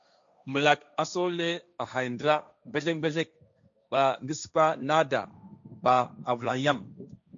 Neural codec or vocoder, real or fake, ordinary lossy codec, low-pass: codec, 16 kHz, 1.1 kbps, Voila-Tokenizer; fake; MP3, 64 kbps; 7.2 kHz